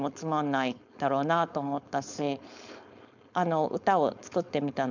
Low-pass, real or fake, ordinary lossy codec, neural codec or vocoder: 7.2 kHz; fake; none; codec, 16 kHz, 4.8 kbps, FACodec